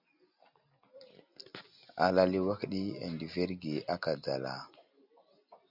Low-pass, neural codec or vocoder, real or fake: 5.4 kHz; none; real